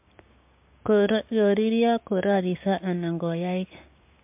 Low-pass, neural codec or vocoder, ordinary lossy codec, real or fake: 3.6 kHz; codec, 16 kHz, 6 kbps, DAC; MP3, 32 kbps; fake